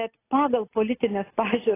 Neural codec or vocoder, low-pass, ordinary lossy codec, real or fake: none; 3.6 kHz; AAC, 16 kbps; real